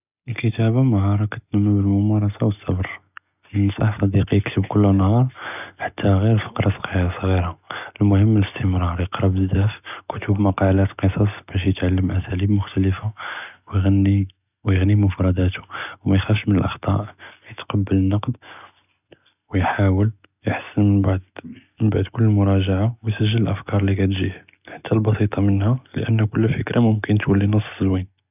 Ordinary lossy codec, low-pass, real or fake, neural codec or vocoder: none; 3.6 kHz; real; none